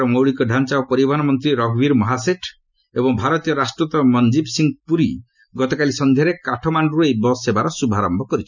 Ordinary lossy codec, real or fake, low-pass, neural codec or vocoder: none; real; 7.2 kHz; none